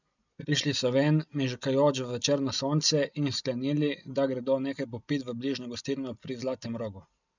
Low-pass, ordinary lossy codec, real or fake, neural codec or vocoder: 7.2 kHz; none; real; none